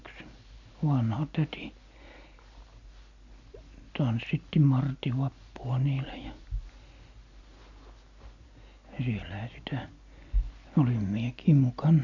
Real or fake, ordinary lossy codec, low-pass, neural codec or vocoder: real; none; 7.2 kHz; none